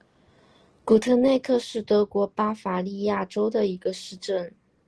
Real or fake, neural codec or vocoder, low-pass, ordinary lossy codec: real; none; 10.8 kHz; Opus, 16 kbps